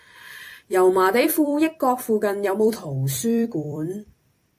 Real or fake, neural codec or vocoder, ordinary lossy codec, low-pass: fake; vocoder, 44.1 kHz, 128 mel bands every 256 samples, BigVGAN v2; AAC, 64 kbps; 14.4 kHz